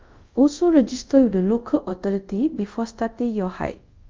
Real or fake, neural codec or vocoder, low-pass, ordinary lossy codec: fake; codec, 24 kHz, 0.5 kbps, DualCodec; 7.2 kHz; Opus, 24 kbps